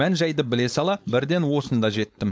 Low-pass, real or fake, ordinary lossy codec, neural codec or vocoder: none; fake; none; codec, 16 kHz, 4.8 kbps, FACodec